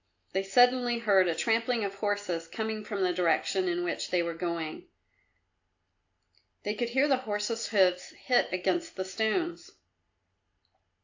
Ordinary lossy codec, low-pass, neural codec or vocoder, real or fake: AAC, 48 kbps; 7.2 kHz; none; real